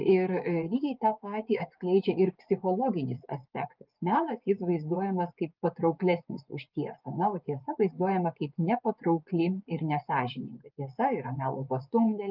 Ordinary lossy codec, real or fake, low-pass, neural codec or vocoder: Opus, 24 kbps; fake; 5.4 kHz; vocoder, 24 kHz, 100 mel bands, Vocos